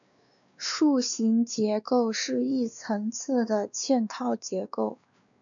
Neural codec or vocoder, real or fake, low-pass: codec, 16 kHz, 2 kbps, X-Codec, WavLM features, trained on Multilingual LibriSpeech; fake; 7.2 kHz